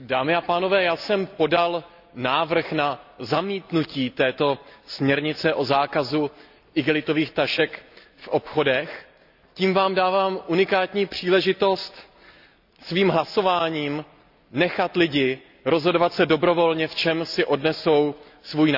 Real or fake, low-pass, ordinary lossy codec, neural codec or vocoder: real; 5.4 kHz; none; none